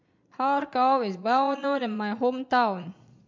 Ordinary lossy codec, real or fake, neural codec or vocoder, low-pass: MP3, 64 kbps; fake; vocoder, 22.05 kHz, 80 mel bands, Vocos; 7.2 kHz